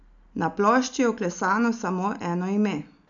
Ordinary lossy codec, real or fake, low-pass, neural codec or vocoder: none; real; 7.2 kHz; none